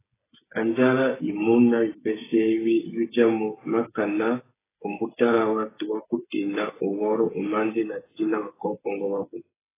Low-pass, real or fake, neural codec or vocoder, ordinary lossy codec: 3.6 kHz; fake; codec, 16 kHz, 8 kbps, FreqCodec, smaller model; AAC, 16 kbps